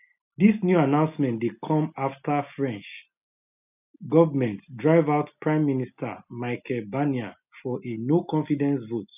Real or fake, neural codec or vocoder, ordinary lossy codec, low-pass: real; none; none; 3.6 kHz